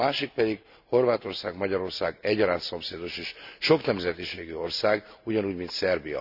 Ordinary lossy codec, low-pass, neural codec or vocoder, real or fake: none; 5.4 kHz; none; real